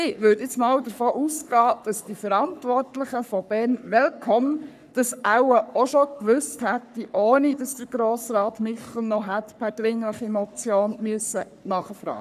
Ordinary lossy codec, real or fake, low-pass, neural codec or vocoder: none; fake; 14.4 kHz; codec, 44.1 kHz, 3.4 kbps, Pupu-Codec